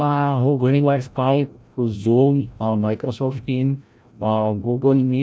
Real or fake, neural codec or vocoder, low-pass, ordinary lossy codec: fake; codec, 16 kHz, 0.5 kbps, FreqCodec, larger model; none; none